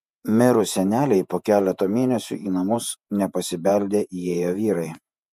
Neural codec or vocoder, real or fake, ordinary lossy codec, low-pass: vocoder, 48 kHz, 128 mel bands, Vocos; fake; MP3, 96 kbps; 14.4 kHz